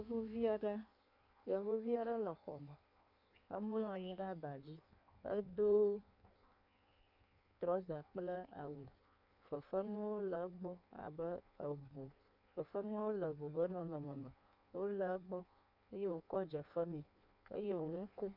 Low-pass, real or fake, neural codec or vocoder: 5.4 kHz; fake; codec, 16 kHz in and 24 kHz out, 1.1 kbps, FireRedTTS-2 codec